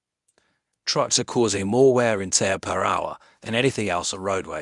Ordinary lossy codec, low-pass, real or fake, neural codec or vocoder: none; 10.8 kHz; fake; codec, 24 kHz, 0.9 kbps, WavTokenizer, medium speech release version 1